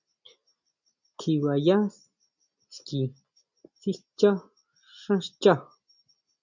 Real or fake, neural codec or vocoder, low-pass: real; none; 7.2 kHz